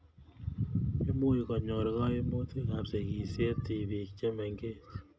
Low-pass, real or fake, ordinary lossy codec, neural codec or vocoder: none; real; none; none